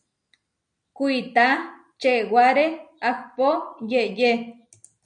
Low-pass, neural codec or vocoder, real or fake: 9.9 kHz; none; real